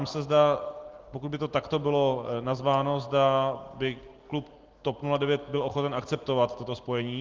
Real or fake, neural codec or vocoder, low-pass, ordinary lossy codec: real; none; 7.2 kHz; Opus, 16 kbps